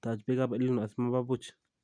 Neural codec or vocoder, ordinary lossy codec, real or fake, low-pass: none; none; real; 9.9 kHz